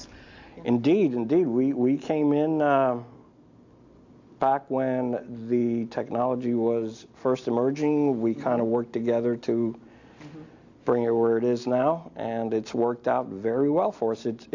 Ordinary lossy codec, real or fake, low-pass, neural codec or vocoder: AAC, 48 kbps; real; 7.2 kHz; none